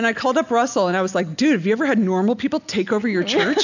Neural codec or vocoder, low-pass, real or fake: none; 7.2 kHz; real